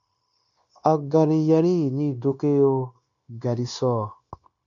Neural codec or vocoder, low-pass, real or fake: codec, 16 kHz, 0.9 kbps, LongCat-Audio-Codec; 7.2 kHz; fake